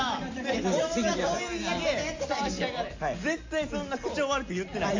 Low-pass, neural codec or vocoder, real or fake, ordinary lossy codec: 7.2 kHz; none; real; AAC, 48 kbps